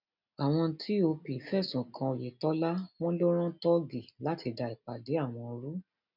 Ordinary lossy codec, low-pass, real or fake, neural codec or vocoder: none; 5.4 kHz; real; none